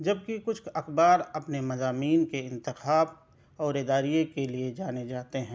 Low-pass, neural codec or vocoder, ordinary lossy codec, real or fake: none; none; none; real